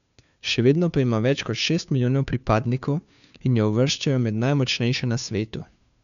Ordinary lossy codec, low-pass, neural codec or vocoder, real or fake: none; 7.2 kHz; codec, 16 kHz, 2 kbps, FunCodec, trained on Chinese and English, 25 frames a second; fake